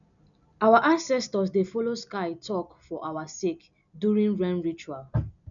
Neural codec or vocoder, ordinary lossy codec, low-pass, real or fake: none; none; 7.2 kHz; real